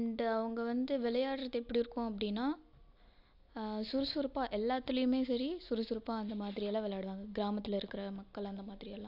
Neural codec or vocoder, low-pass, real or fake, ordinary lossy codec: none; 5.4 kHz; real; AAC, 48 kbps